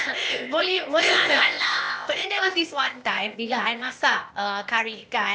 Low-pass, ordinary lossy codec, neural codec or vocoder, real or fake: none; none; codec, 16 kHz, 0.8 kbps, ZipCodec; fake